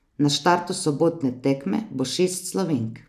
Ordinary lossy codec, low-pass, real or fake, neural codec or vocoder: none; 14.4 kHz; real; none